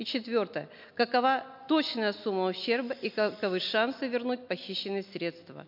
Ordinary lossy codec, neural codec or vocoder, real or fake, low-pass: MP3, 48 kbps; none; real; 5.4 kHz